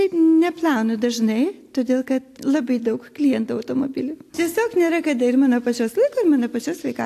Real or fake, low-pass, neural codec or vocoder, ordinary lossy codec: real; 14.4 kHz; none; AAC, 64 kbps